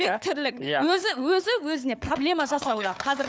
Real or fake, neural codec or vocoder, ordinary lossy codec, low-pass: fake; codec, 16 kHz, 4 kbps, FunCodec, trained on Chinese and English, 50 frames a second; none; none